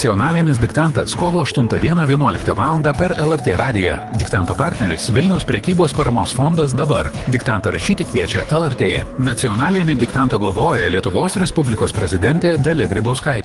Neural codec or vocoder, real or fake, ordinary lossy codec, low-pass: codec, 24 kHz, 3 kbps, HILCodec; fake; Opus, 32 kbps; 10.8 kHz